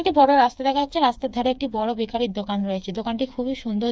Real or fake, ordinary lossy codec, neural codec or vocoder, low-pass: fake; none; codec, 16 kHz, 4 kbps, FreqCodec, smaller model; none